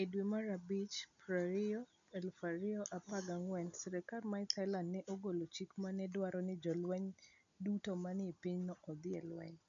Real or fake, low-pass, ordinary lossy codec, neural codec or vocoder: real; 7.2 kHz; none; none